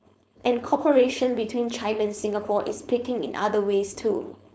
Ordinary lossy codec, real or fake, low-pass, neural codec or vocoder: none; fake; none; codec, 16 kHz, 4.8 kbps, FACodec